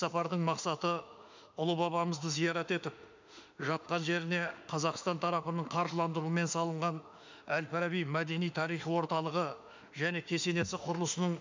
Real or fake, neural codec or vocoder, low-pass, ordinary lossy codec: fake; autoencoder, 48 kHz, 32 numbers a frame, DAC-VAE, trained on Japanese speech; 7.2 kHz; MP3, 64 kbps